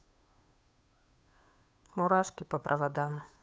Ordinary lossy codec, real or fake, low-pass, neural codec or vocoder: none; fake; none; codec, 16 kHz, 2 kbps, FunCodec, trained on Chinese and English, 25 frames a second